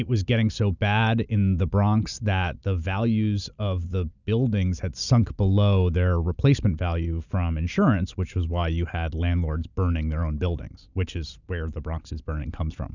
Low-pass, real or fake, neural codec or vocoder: 7.2 kHz; real; none